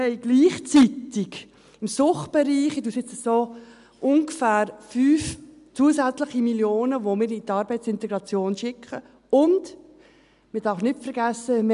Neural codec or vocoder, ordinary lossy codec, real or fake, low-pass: none; none; real; 10.8 kHz